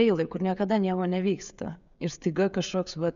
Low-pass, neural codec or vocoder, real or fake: 7.2 kHz; codec, 16 kHz, 16 kbps, FreqCodec, smaller model; fake